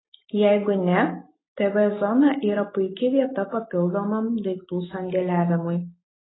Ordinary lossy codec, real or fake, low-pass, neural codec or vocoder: AAC, 16 kbps; real; 7.2 kHz; none